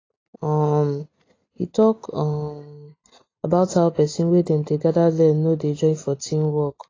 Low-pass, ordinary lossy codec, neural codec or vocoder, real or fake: 7.2 kHz; AAC, 32 kbps; none; real